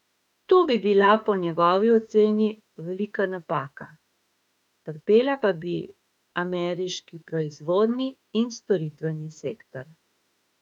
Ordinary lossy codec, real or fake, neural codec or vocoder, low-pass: none; fake; autoencoder, 48 kHz, 32 numbers a frame, DAC-VAE, trained on Japanese speech; 19.8 kHz